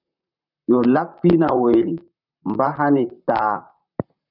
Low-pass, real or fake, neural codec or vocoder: 5.4 kHz; fake; vocoder, 44.1 kHz, 128 mel bands, Pupu-Vocoder